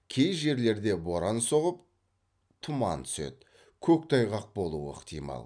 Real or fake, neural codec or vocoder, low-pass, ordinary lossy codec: real; none; 9.9 kHz; none